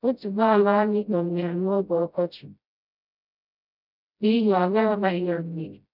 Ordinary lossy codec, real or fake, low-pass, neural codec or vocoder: none; fake; 5.4 kHz; codec, 16 kHz, 0.5 kbps, FreqCodec, smaller model